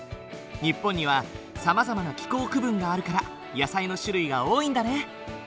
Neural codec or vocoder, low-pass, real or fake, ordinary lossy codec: none; none; real; none